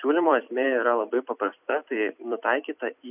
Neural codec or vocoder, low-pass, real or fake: vocoder, 44.1 kHz, 128 mel bands every 256 samples, BigVGAN v2; 3.6 kHz; fake